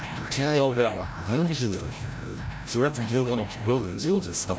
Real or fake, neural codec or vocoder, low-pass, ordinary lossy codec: fake; codec, 16 kHz, 0.5 kbps, FreqCodec, larger model; none; none